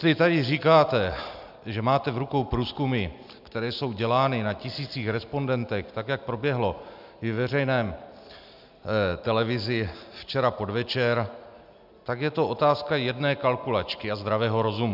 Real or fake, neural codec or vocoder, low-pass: real; none; 5.4 kHz